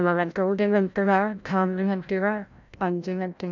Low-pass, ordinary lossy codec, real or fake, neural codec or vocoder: 7.2 kHz; none; fake; codec, 16 kHz, 0.5 kbps, FreqCodec, larger model